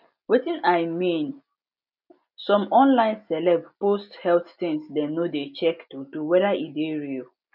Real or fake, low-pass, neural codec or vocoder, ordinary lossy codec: real; 5.4 kHz; none; none